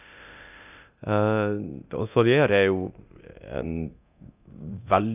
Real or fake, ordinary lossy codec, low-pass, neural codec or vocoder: fake; none; 3.6 kHz; codec, 24 kHz, 0.9 kbps, DualCodec